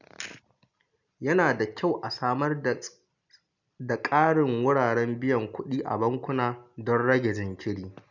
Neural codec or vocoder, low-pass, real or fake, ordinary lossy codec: none; 7.2 kHz; real; none